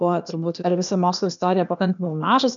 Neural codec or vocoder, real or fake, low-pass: codec, 16 kHz, 0.8 kbps, ZipCodec; fake; 7.2 kHz